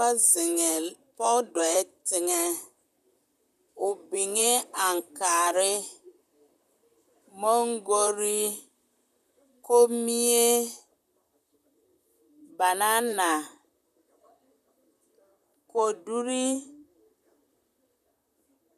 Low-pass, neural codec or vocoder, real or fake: 14.4 kHz; vocoder, 44.1 kHz, 128 mel bands, Pupu-Vocoder; fake